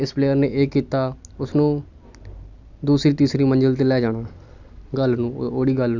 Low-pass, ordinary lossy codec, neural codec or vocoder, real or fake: 7.2 kHz; none; none; real